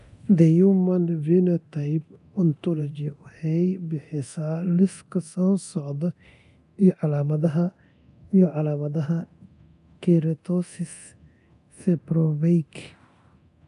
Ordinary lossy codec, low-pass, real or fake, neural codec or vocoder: none; 10.8 kHz; fake; codec, 24 kHz, 0.9 kbps, DualCodec